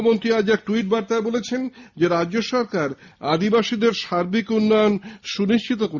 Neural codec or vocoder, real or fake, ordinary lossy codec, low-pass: none; real; Opus, 64 kbps; 7.2 kHz